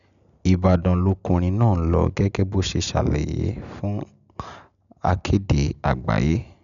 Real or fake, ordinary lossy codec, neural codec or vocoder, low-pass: real; none; none; 7.2 kHz